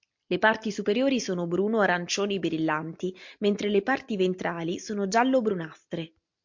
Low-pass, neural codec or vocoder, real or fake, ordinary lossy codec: 7.2 kHz; none; real; MP3, 48 kbps